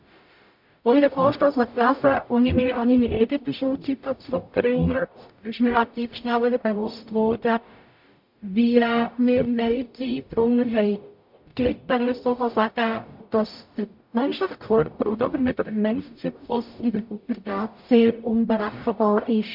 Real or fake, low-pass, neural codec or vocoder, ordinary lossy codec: fake; 5.4 kHz; codec, 44.1 kHz, 0.9 kbps, DAC; MP3, 48 kbps